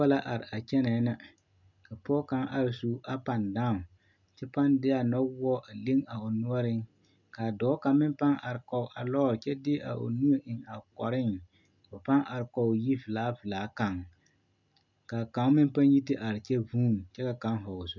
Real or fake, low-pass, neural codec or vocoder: real; 7.2 kHz; none